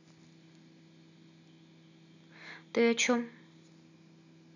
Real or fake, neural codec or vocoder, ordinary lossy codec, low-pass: real; none; none; 7.2 kHz